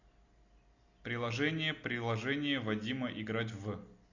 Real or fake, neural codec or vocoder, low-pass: real; none; 7.2 kHz